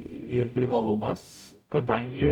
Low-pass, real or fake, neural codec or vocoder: 19.8 kHz; fake; codec, 44.1 kHz, 0.9 kbps, DAC